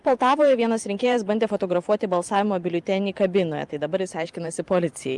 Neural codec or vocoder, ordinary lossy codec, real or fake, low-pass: vocoder, 44.1 kHz, 128 mel bands every 512 samples, BigVGAN v2; Opus, 32 kbps; fake; 10.8 kHz